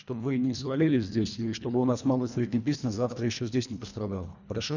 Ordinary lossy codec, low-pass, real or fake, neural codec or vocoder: none; 7.2 kHz; fake; codec, 24 kHz, 1.5 kbps, HILCodec